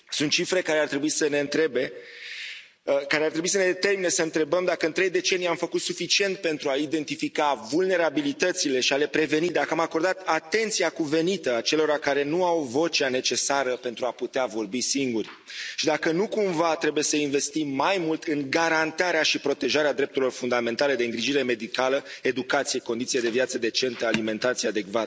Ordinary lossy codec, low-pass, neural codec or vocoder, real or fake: none; none; none; real